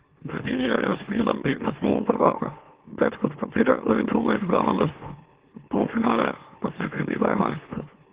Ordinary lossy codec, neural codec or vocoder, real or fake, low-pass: Opus, 16 kbps; autoencoder, 44.1 kHz, a latent of 192 numbers a frame, MeloTTS; fake; 3.6 kHz